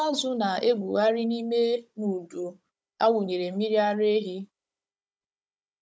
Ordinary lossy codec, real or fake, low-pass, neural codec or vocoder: none; fake; none; codec, 16 kHz, 16 kbps, FunCodec, trained on Chinese and English, 50 frames a second